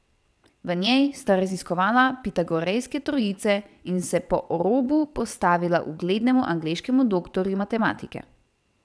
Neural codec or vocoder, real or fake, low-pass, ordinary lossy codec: vocoder, 22.05 kHz, 80 mel bands, WaveNeXt; fake; none; none